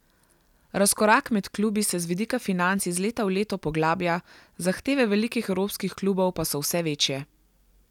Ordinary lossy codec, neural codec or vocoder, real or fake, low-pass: none; none; real; 19.8 kHz